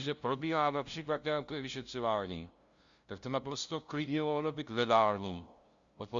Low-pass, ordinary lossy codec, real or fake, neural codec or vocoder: 7.2 kHz; Opus, 64 kbps; fake; codec, 16 kHz, 0.5 kbps, FunCodec, trained on LibriTTS, 25 frames a second